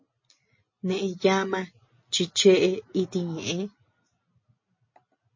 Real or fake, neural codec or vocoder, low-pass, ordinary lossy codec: real; none; 7.2 kHz; MP3, 32 kbps